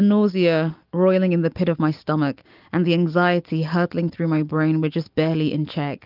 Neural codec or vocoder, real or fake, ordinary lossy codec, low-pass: none; real; Opus, 24 kbps; 5.4 kHz